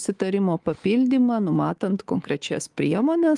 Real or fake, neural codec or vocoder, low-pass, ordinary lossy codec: fake; codec, 24 kHz, 3.1 kbps, DualCodec; 10.8 kHz; Opus, 24 kbps